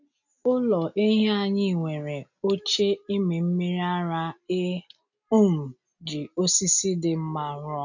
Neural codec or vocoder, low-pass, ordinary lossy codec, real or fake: none; 7.2 kHz; none; real